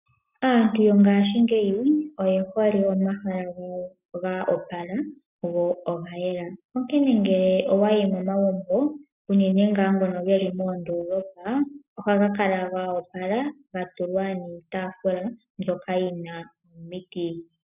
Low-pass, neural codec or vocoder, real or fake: 3.6 kHz; none; real